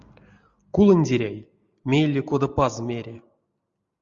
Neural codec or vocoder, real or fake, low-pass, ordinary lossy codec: none; real; 7.2 kHz; Opus, 64 kbps